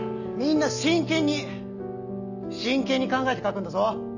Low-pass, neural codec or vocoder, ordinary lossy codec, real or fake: 7.2 kHz; none; none; real